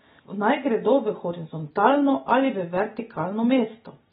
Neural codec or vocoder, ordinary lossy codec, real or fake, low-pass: none; AAC, 16 kbps; real; 19.8 kHz